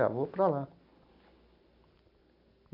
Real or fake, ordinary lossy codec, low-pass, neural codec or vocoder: real; none; 5.4 kHz; none